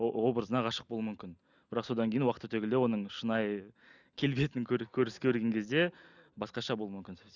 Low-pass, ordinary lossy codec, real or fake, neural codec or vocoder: 7.2 kHz; none; real; none